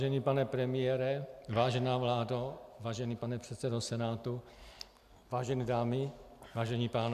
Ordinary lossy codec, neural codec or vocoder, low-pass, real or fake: AAC, 96 kbps; none; 14.4 kHz; real